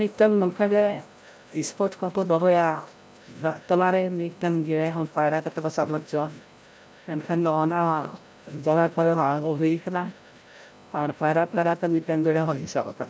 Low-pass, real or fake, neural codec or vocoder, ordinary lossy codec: none; fake; codec, 16 kHz, 0.5 kbps, FreqCodec, larger model; none